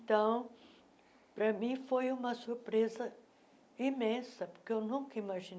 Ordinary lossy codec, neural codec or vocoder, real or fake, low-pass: none; none; real; none